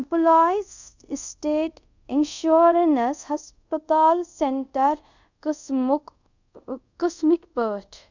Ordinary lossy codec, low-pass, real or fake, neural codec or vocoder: none; 7.2 kHz; fake; codec, 24 kHz, 0.5 kbps, DualCodec